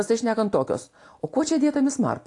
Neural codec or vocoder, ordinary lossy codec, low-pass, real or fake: none; AAC, 48 kbps; 10.8 kHz; real